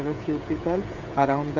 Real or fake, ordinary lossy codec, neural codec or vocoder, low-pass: fake; none; codec, 16 kHz, 16 kbps, FreqCodec, smaller model; 7.2 kHz